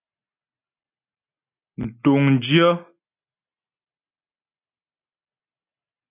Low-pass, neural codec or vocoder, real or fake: 3.6 kHz; none; real